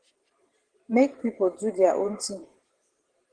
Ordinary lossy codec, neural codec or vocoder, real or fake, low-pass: Opus, 16 kbps; vocoder, 44.1 kHz, 128 mel bands every 512 samples, BigVGAN v2; fake; 9.9 kHz